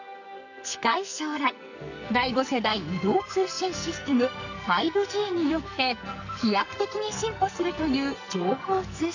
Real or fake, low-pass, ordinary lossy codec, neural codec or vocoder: fake; 7.2 kHz; none; codec, 44.1 kHz, 2.6 kbps, SNAC